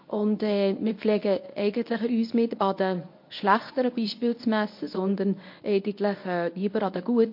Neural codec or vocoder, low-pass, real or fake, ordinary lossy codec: codec, 24 kHz, 0.9 kbps, WavTokenizer, medium speech release version 1; 5.4 kHz; fake; MP3, 32 kbps